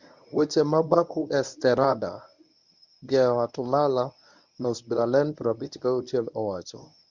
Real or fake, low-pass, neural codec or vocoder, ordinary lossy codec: fake; 7.2 kHz; codec, 24 kHz, 0.9 kbps, WavTokenizer, medium speech release version 1; none